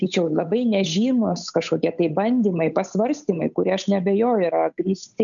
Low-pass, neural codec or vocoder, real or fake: 7.2 kHz; codec, 16 kHz, 8 kbps, FunCodec, trained on Chinese and English, 25 frames a second; fake